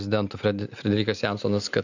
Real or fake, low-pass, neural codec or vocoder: real; 7.2 kHz; none